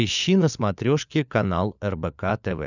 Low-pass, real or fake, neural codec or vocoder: 7.2 kHz; fake; codec, 16 kHz, 16 kbps, FunCodec, trained on LibriTTS, 50 frames a second